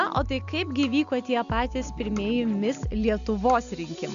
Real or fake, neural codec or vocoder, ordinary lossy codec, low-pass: real; none; MP3, 96 kbps; 7.2 kHz